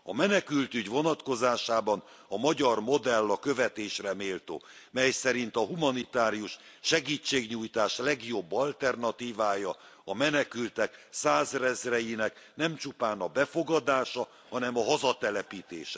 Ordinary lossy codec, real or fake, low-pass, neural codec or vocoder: none; real; none; none